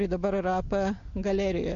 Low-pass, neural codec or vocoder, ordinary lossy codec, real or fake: 7.2 kHz; none; MP3, 48 kbps; real